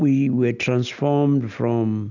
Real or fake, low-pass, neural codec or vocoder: real; 7.2 kHz; none